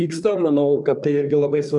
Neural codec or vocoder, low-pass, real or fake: codec, 24 kHz, 1 kbps, SNAC; 10.8 kHz; fake